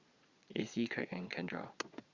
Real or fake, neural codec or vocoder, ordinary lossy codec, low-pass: fake; vocoder, 22.05 kHz, 80 mel bands, Vocos; Opus, 64 kbps; 7.2 kHz